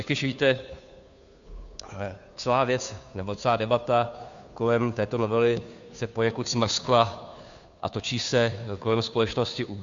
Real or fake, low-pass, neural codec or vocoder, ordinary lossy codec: fake; 7.2 kHz; codec, 16 kHz, 2 kbps, FunCodec, trained on Chinese and English, 25 frames a second; MP3, 64 kbps